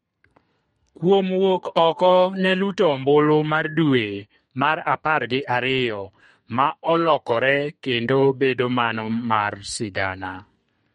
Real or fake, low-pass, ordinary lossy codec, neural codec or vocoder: fake; 14.4 kHz; MP3, 48 kbps; codec, 32 kHz, 1.9 kbps, SNAC